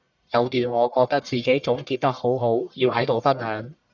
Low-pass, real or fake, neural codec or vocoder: 7.2 kHz; fake; codec, 44.1 kHz, 1.7 kbps, Pupu-Codec